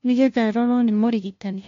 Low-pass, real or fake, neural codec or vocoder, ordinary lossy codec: 7.2 kHz; fake; codec, 16 kHz, 0.5 kbps, FunCodec, trained on LibriTTS, 25 frames a second; MP3, 48 kbps